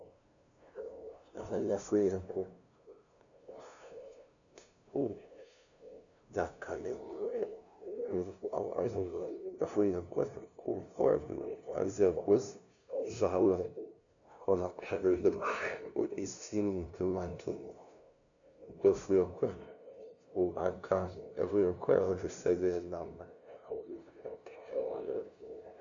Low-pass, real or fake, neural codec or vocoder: 7.2 kHz; fake; codec, 16 kHz, 0.5 kbps, FunCodec, trained on LibriTTS, 25 frames a second